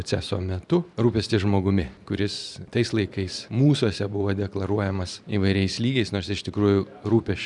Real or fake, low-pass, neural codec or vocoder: fake; 10.8 kHz; vocoder, 44.1 kHz, 128 mel bands every 512 samples, BigVGAN v2